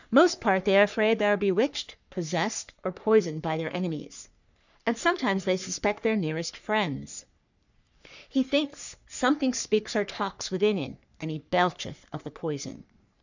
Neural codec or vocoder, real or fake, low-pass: codec, 44.1 kHz, 3.4 kbps, Pupu-Codec; fake; 7.2 kHz